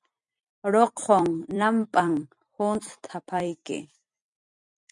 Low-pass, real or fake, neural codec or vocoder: 10.8 kHz; fake; vocoder, 44.1 kHz, 128 mel bands every 256 samples, BigVGAN v2